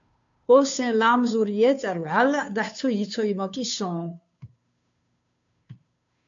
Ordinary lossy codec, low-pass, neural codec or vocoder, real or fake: AAC, 64 kbps; 7.2 kHz; codec, 16 kHz, 2 kbps, FunCodec, trained on Chinese and English, 25 frames a second; fake